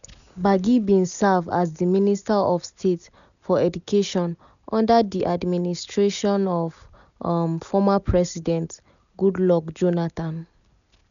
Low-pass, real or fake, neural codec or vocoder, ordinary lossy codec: 7.2 kHz; real; none; none